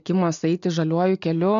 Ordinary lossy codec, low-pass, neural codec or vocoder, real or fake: MP3, 64 kbps; 7.2 kHz; none; real